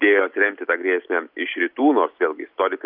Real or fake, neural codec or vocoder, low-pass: real; none; 5.4 kHz